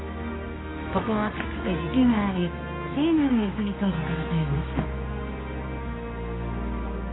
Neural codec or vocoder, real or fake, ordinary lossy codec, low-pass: codec, 24 kHz, 0.9 kbps, WavTokenizer, medium music audio release; fake; AAC, 16 kbps; 7.2 kHz